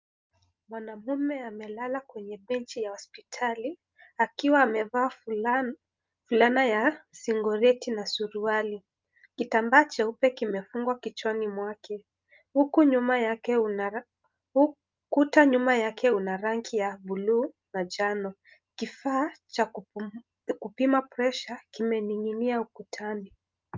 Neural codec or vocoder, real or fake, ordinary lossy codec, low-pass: none; real; Opus, 32 kbps; 7.2 kHz